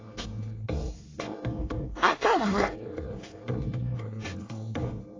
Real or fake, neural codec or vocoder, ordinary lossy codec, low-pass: fake; codec, 24 kHz, 1 kbps, SNAC; AAC, 32 kbps; 7.2 kHz